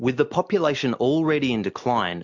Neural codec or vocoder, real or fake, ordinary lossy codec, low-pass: none; real; MP3, 64 kbps; 7.2 kHz